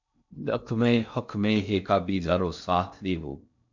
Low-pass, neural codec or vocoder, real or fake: 7.2 kHz; codec, 16 kHz in and 24 kHz out, 0.6 kbps, FocalCodec, streaming, 2048 codes; fake